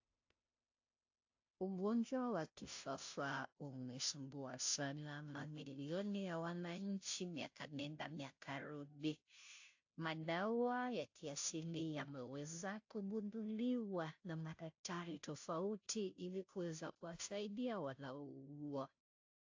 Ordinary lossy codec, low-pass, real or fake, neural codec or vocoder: AAC, 48 kbps; 7.2 kHz; fake; codec, 16 kHz, 0.5 kbps, FunCodec, trained on Chinese and English, 25 frames a second